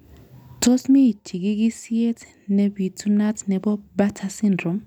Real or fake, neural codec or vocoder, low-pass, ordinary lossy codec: real; none; 19.8 kHz; none